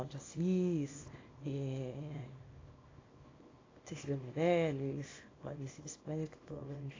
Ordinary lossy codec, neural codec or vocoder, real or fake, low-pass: AAC, 32 kbps; codec, 24 kHz, 0.9 kbps, WavTokenizer, small release; fake; 7.2 kHz